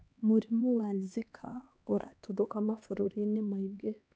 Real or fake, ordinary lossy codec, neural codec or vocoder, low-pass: fake; none; codec, 16 kHz, 2 kbps, X-Codec, HuBERT features, trained on LibriSpeech; none